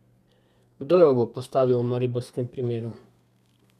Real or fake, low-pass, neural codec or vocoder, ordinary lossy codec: fake; 14.4 kHz; codec, 32 kHz, 1.9 kbps, SNAC; none